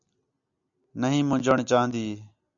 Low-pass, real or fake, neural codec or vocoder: 7.2 kHz; real; none